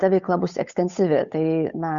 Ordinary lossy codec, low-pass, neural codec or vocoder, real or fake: Opus, 64 kbps; 7.2 kHz; codec, 16 kHz, 8 kbps, FunCodec, trained on Chinese and English, 25 frames a second; fake